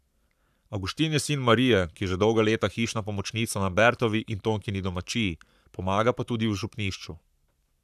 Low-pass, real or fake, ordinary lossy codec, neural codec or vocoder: 14.4 kHz; fake; none; codec, 44.1 kHz, 7.8 kbps, Pupu-Codec